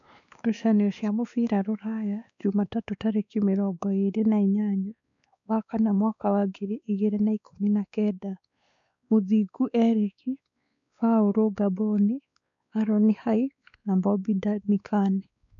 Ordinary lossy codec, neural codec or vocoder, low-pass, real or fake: none; codec, 16 kHz, 2 kbps, X-Codec, WavLM features, trained on Multilingual LibriSpeech; 7.2 kHz; fake